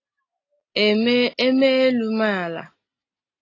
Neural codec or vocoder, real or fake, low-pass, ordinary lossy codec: none; real; 7.2 kHz; AAC, 32 kbps